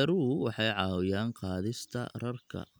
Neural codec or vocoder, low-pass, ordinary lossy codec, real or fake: none; none; none; real